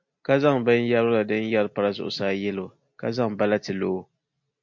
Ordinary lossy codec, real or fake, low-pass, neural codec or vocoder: MP3, 48 kbps; real; 7.2 kHz; none